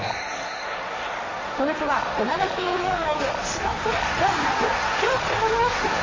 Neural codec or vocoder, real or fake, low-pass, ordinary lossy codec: codec, 16 kHz, 1.1 kbps, Voila-Tokenizer; fake; 7.2 kHz; MP3, 32 kbps